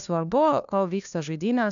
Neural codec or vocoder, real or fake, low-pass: codec, 16 kHz, 1 kbps, FunCodec, trained on LibriTTS, 50 frames a second; fake; 7.2 kHz